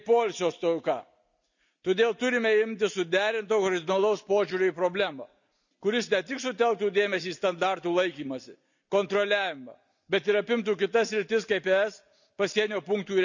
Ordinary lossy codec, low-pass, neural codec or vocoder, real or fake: none; 7.2 kHz; none; real